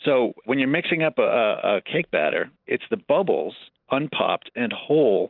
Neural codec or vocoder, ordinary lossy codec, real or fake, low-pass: none; Opus, 24 kbps; real; 5.4 kHz